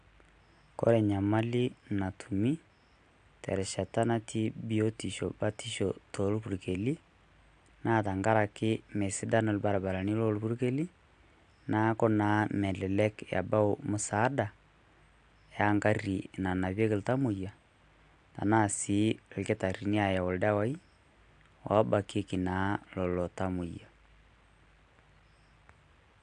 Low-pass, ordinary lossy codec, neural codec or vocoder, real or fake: 9.9 kHz; AAC, 64 kbps; none; real